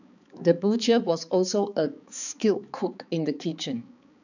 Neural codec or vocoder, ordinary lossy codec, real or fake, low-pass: codec, 16 kHz, 4 kbps, X-Codec, HuBERT features, trained on balanced general audio; none; fake; 7.2 kHz